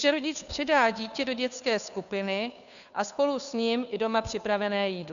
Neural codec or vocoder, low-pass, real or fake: codec, 16 kHz, 2 kbps, FunCodec, trained on Chinese and English, 25 frames a second; 7.2 kHz; fake